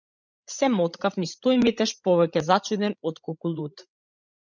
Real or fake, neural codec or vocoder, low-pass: fake; codec, 16 kHz, 16 kbps, FreqCodec, larger model; 7.2 kHz